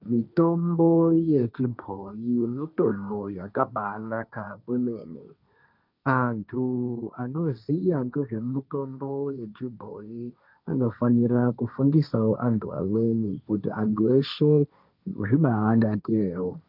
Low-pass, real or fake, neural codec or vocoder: 5.4 kHz; fake; codec, 16 kHz, 1.1 kbps, Voila-Tokenizer